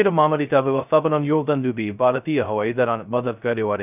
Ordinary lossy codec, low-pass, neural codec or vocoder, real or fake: none; 3.6 kHz; codec, 16 kHz, 0.2 kbps, FocalCodec; fake